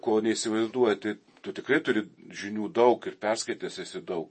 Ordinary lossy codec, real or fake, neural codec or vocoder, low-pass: MP3, 32 kbps; fake; vocoder, 48 kHz, 128 mel bands, Vocos; 10.8 kHz